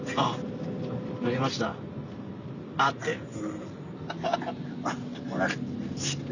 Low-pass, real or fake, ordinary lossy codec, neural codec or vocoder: 7.2 kHz; real; none; none